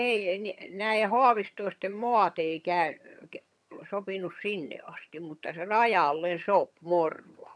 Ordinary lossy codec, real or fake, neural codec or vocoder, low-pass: none; fake; vocoder, 22.05 kHz, 80 mel bands, HiFi-GAN; none